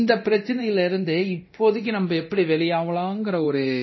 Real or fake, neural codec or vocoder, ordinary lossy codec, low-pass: fake; codec, 24 kHz, 0.9 kbps, DualCodec; MP3, 24 kbps; 7.2 kHz